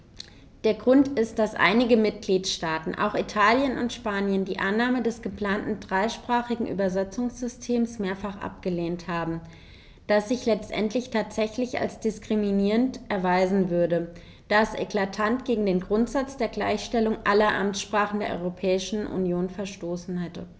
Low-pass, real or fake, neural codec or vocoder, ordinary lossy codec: none; real; none; none